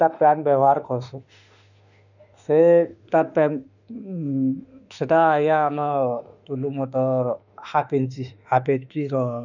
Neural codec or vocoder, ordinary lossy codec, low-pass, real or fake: autoencoder, 48 kHz, 32 numbers a frame, DAC-VAE, trained on Japanese speech; none; 7.2 kHz; fake